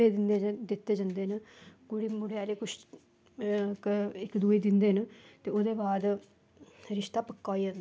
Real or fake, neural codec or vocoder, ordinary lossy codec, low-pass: real; none; none; none